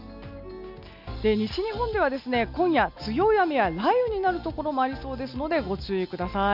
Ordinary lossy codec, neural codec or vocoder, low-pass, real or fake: none; none; 5.4 kHz; real